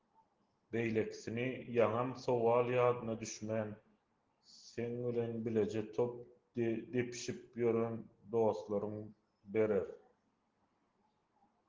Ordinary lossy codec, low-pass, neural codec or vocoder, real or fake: Opus, 16 kbps; 7.2 kHz; none; real